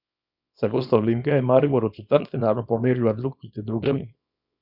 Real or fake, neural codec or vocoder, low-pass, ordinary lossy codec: fake; codec, 24 kHz, 0.9 kbps, WavTokenizer, small release; 5.4 kHz; none